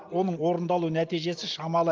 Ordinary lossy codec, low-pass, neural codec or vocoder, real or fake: Opus, 24 kbps; 7.2 kHz; none; real